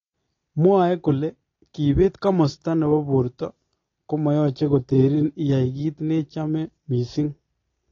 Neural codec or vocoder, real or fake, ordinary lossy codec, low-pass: none; real; AAC, 32 kbps; 7.2 kHz